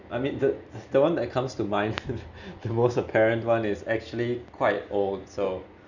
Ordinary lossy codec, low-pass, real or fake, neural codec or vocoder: none; 7.2 kHz; real; none